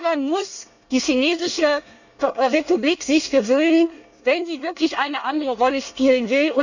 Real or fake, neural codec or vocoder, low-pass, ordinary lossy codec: fake; codec, 24 kHz, 1 kbps, SNAC; 7.2 kHz; none